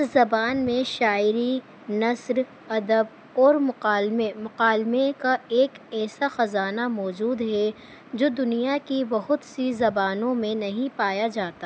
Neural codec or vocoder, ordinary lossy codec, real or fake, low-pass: none; none; real; none